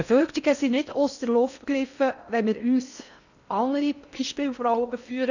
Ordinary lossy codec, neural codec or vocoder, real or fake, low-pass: none; codec, 16 kHz in and 24 kHz out, 0.6 kbps, FocalCodec, streaming, 4096 codes; fake; 7.2 kHz